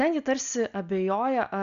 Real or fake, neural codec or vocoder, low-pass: real; none; 7.2 kHz